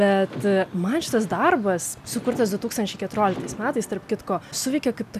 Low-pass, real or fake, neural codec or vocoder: 14.4 kHz; real; none